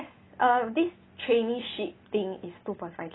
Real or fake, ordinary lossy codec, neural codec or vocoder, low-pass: real; AAC, 16 kbps; none; 7.2 kHz